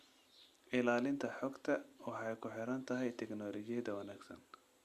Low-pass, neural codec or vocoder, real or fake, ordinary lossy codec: 14.4 kHz; none; real; Opus, 64 kbps